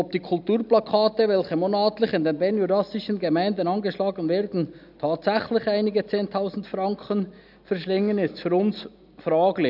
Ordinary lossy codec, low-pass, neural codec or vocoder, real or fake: none; 5.4 kHz; none; real